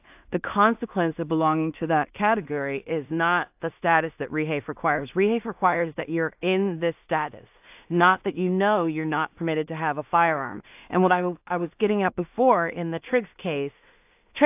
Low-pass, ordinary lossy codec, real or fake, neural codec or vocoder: 3.6 kHz; AAC, 32 kbps; fake; codec, 16 kHz in and 24 kHz out, 0.4 kbps, LongCat-Audio-Codec, two codebook decoder